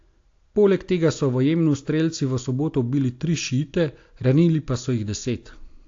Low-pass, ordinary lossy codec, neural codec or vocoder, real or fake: 7.2 kHz; AAC, 48 kbps; none; real